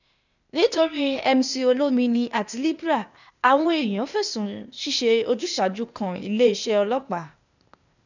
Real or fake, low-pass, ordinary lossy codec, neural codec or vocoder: fake; 7.2 kHz; none; codec, 16 kHz, 0.8 kbps, ZipCodec